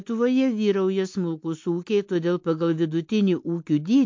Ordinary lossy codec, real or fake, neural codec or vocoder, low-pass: MP3, 48 kbps; real; none; 7.2 kHz